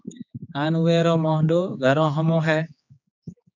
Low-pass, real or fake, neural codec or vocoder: 7.2 kHz; fake; codec, 16 kHz, 4 kbps, X-Codec, HuBERT features, trained on general audio